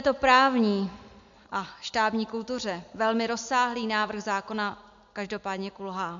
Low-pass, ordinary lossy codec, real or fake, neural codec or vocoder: 7.2 kHz; MP3, 64 kbps; real; none